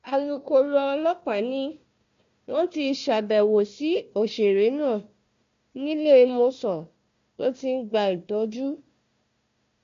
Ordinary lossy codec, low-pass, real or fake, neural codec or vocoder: MP3, 48 kbps; 7.2 kHz; fake; codec, 16 kHz, 1 kbps, FunCodec, trained on Chinese and English, 50 frames a second